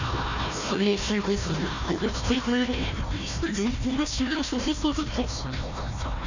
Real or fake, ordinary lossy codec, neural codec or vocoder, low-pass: fake; none; codec, 16 kHz, 1 kbps, FunCodec, trained on Chinese and English, 50 frames a second; 7.2 kHz